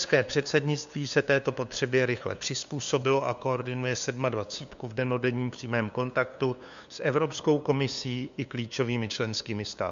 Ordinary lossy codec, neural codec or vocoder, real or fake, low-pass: MP3, 64 kbps; codec, 16 kHz, 2 kbps, FunCodec, trained on LibriTTS, 25 frames a second; fake; 7.2 kHz